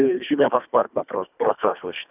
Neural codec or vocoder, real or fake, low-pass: codec, 24 kHz, 1.5 kbps, HILCodec; fake; 3.6 kHz